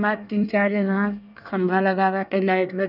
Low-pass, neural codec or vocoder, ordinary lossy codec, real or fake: 5.4 kHz; codec, 24 kHz, 1 kbps, SNAC; none; fake